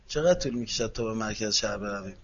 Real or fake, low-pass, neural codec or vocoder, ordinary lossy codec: real; 7.2 kHz; none; AAC, 64 kbps